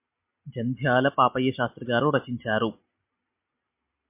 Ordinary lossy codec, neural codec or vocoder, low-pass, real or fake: MP3, 32 kbps; none; 3.6 kHz; real